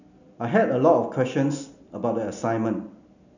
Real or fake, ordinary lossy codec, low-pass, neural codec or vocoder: real; none; 7.2 kHz; none